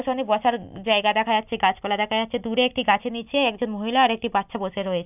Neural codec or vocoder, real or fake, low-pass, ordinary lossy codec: none; real; 3.6 kHz; none